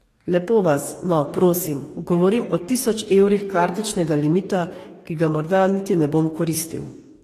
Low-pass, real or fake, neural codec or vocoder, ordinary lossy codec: 14.4 kHz; fake; codec, 44.1 kHz, 2.6 kbps, DAC; AAC, 48 kbps